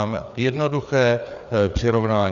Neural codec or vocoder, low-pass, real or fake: codec, 16 kHz, 4 kbps, FreqCodec, larger model; 7.2 kHz; fake